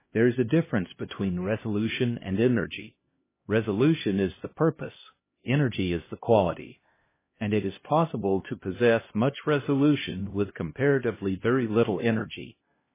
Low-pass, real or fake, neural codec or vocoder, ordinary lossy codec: 3.6 kHz; fake; codec, 16 kHz, 1 kbps, X-Codec, HuBERT features, trained on LibriSpeech; MP3, 16 kbps